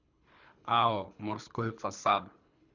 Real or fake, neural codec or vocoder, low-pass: fake; codec, 24 kHz, 3 kbps, HILCodec; 7.2 kHz